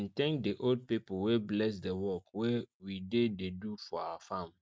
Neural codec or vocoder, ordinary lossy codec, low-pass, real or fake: none; none; none; real